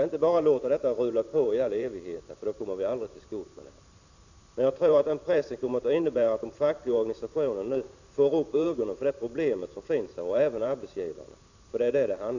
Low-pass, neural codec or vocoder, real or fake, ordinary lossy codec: 7.2 kHz; vocoder, 44.1 kHz, 128 mel bands every 512 samples, BigVGAN v2; fake; none